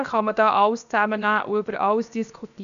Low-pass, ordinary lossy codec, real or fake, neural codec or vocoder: 7.2 kHz; none; fake; codec, 16 kHz, 0.7 kbps, FocalCodec